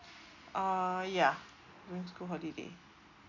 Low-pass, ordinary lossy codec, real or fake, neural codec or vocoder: 7.2 kHz; none; real; none